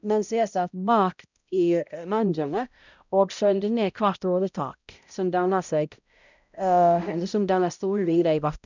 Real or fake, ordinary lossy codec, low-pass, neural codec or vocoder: fake; none; 7.2 kHz; codec, 16 kHz, 0.5 kbps, X-Codec, HuBERT features, trained on balanced general audio